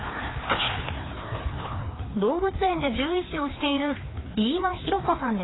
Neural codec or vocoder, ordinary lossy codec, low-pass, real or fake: codec, 16 kHz, 2 kbps, FreqCodec, larger model; AAC, 16 kbps; 7.2 kHz; fake